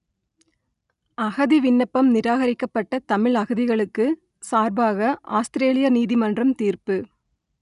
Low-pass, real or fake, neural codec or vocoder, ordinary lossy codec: 10.8 kHz; real; none; none